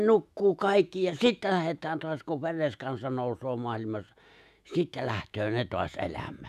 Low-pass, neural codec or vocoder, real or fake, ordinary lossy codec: 14.4 kHz; autoencoder, 48 kHz, 128 numbers a frame, DAC-VAE, trained on Japanese speech; fake; none